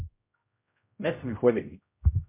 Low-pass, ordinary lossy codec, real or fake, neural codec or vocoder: 3.6 kHz; MP3, 32 kbps; fake; codec, 16 kHz, 0.5 kbps, X-Codec, HuBERT features, trained on general audio